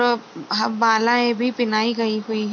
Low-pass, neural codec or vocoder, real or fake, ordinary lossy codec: 7.2 kHz; none; real; none